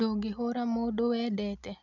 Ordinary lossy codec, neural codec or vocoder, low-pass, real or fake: none; none; 7.2 kHz; real